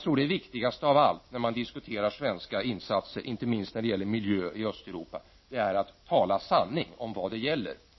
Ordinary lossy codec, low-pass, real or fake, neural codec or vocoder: MP3, 24 kbps; 7.2 kHz; real; none